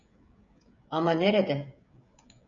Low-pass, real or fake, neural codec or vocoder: 7.2 kHz; fake; codec, 16 kHz, 16 kbps, FreqCodec, smaller model